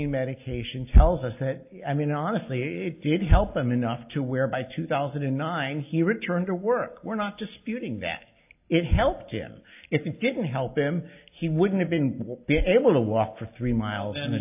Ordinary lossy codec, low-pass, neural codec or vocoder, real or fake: AAC, 32 kbps; 3.6 kHz; none; real